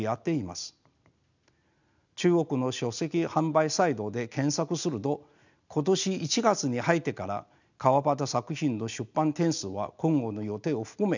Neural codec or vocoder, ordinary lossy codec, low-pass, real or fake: none; none; 7.2 kHz; real